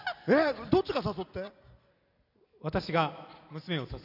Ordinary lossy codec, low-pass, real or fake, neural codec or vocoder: none; 5.4 kHz; real; none